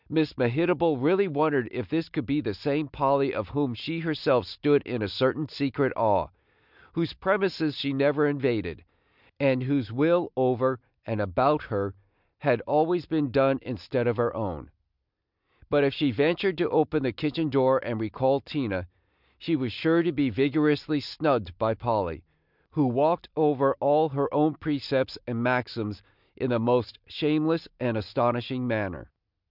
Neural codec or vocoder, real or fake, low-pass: none; real; 5.4 kHz